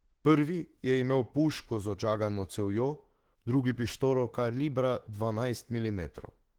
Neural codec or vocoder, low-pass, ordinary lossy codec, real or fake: autoencoder, 48 kHz, 32 numbers a frame, DAC-VAE, trained on Japanese speech; 19.8 kHz; Opus, 16 kbps; fake